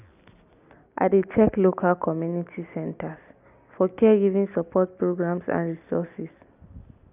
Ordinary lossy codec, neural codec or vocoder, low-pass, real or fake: none; none; 3.6 kHz; real